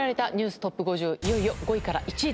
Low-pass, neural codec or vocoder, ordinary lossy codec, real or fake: none; none; none; real